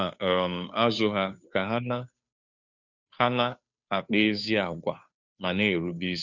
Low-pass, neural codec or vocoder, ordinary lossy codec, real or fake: 7.2 kHz; codec, 16 kHz, 2 kbps, FunCodec, trained on Chinese and English, 25 frames a second; none; fake